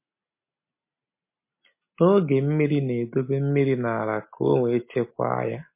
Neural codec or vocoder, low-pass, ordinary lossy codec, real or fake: none; 3.6 kHz; MP3, 24 kbps; real